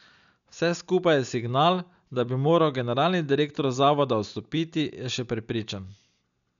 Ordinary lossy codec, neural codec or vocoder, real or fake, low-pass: none; none; real; 7.2 kHz